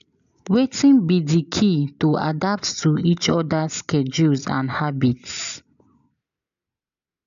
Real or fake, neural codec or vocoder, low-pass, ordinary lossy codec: real; none; 7.2 kHz; none